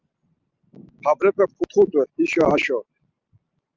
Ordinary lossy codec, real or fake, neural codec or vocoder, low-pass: Opus, 24 kbps; real; none; 7.2 kHz